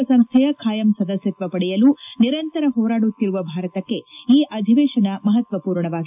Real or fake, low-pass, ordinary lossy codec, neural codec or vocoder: real; 3.6 kHz; AAC, 32 kbps; none